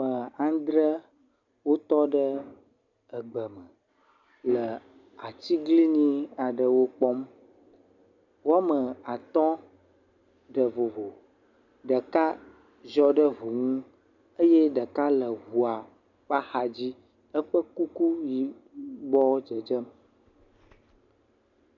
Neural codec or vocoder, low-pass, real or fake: none; 7.2 kHz; real